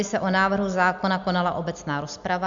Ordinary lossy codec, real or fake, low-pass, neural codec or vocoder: MP3, 64 kbps; real; 7.2 kHz; none